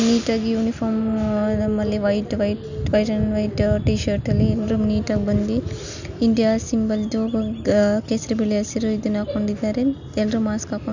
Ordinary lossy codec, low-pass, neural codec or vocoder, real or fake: none; 7.2 kHz; none; real